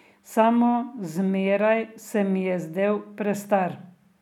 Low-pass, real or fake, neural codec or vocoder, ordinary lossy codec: 19.8 kHz; real; none; none